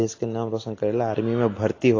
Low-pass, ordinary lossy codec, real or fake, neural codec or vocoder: 7.2 kHz; AAC, 32 kbps; real; none